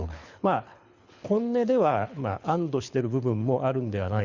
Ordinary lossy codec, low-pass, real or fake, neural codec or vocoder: Opus, 64 kbps; 7.2 kHz; fake; codec, 24 kHz, 6 kbps, HILCodec